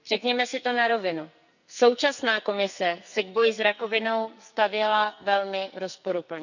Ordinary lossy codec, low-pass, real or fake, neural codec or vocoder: none; 7.2 kHz; fake; codec, 44.1 kHz, 2.6 kbps, SNAC